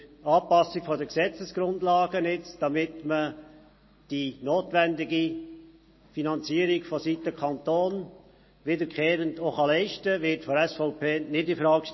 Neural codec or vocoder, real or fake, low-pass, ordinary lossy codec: none; real; 7.2 kHz; MP3, 24 kbps